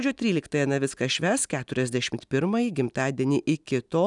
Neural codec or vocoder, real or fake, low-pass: none; real; 10.8 kHz